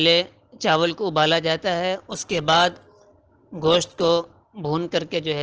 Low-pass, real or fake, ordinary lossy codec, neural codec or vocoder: 7.2 kHz; real; Opus, 16 kbps; none